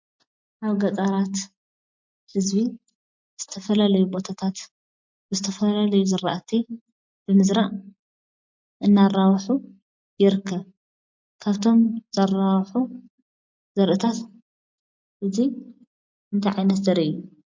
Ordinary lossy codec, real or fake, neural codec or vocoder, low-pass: MP3, 48 kbps; real; none; 7.2 kHz